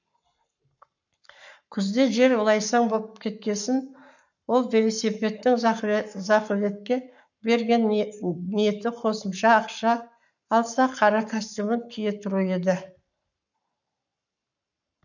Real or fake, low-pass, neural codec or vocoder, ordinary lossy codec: fake; 7.2 kHz; codec, 44.1 kHz, 7.8 kbps, Pupu-Codec; none